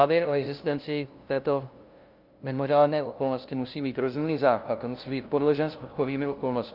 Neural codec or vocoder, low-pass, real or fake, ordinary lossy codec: codec, 16 kHz, 0.5 kbps, FunCodec, trained on LibriTTS, 25 frames a second; 5.4 kHz; fake; Opus, 24 kbps